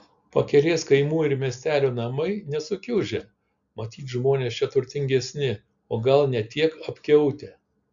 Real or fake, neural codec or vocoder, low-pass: real; none; 7.2 kHz